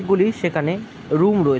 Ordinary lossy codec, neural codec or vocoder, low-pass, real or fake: none; none; none; real